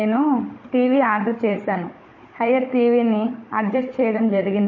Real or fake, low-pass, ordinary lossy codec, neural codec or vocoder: fake; 7.2 kHz; MP3, 32 kbps; codec, 16 kHz, 16 kbps, FunCodec, trained on Chinese and English, 50 frames a second